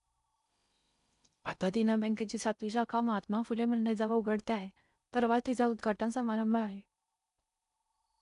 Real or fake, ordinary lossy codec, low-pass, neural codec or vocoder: fake; none; 10.8 kHz; codec, 16 kHz in and 24 kHz out, 0.6 kbps, FocalCodec, streaming, 4096 codes